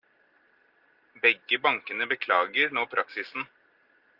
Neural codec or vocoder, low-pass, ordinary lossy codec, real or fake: none; 5.4 kHz; Opus, 32 kbps; real